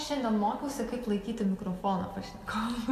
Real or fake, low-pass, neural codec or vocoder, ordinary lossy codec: real; 14.4 kHz; none; AAC, 64 kbps